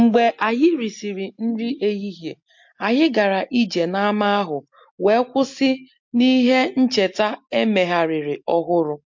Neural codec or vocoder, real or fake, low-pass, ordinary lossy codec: none; real; 7.2 kHz; MP3, 48 kbps